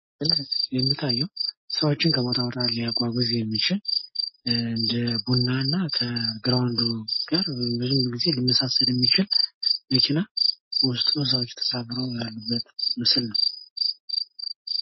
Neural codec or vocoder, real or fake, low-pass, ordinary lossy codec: none; real; 7.2 kHz; MP3, 24 kbps